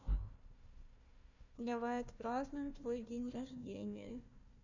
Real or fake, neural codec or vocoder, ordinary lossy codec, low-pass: fake; codec, 16 kHz, 1 kbps, FunCodec, trained on Chinese and English, 50 frames a second; none; 7.2 kHz